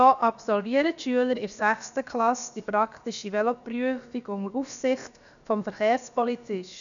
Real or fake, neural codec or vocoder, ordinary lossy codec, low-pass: fake; codec, 16 kHz, about 1 kbps, DyCAST, with the encoder's durations; none; 7.2 kHz